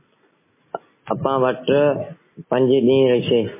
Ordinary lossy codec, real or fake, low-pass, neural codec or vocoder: MP3, 16 kbps; real; 3.6 kHz; none